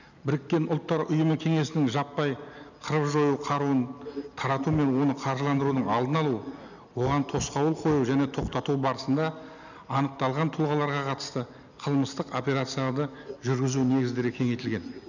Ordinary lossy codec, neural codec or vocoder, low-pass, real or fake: none; none; 7.2 kHz; real